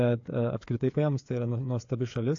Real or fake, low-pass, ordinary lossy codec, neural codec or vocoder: fake; 7.2 kHz; AAC, 48 kbps; codec, 16 kHz, 8 kbps, FreqCodec, smaller model